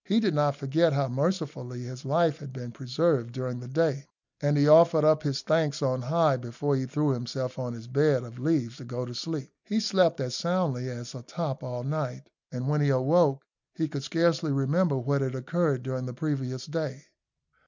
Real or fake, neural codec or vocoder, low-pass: real; none; 7.2 kHz